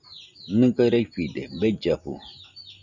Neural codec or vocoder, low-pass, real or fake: none; 7.2 kHz; real